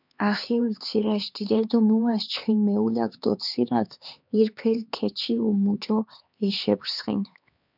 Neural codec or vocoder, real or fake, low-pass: codec, 16 kHz, 4 kbps, X-Codec, HuBERT features, trained on LibriSpeech; fake; 5.4 kHz